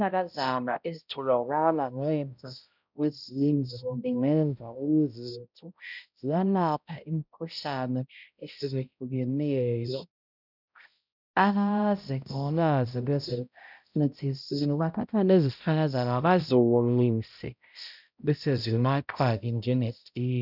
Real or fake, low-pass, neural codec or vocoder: fake; 5.4 kHz; codec, 16 kHz, 0.5 kbps, X-Codec, HuBERT features, trained on balanced general audio